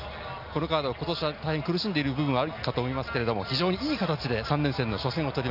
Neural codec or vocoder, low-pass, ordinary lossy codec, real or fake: none; 5.4 kHz; none; real